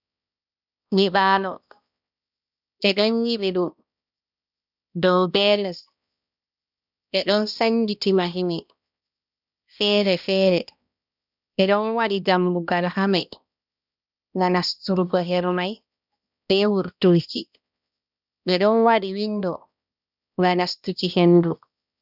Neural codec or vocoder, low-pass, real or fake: codec, 16 kHz, 1 kbps, X-Codec, HuBERT features, trained on balanced general audio; 5.4 kHz; fake